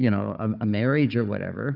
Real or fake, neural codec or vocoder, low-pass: fake; codec, 16 kHz, 4 kbps, FunCodec, trained on Chinese and English, 50 frames a second; 5.4 kHz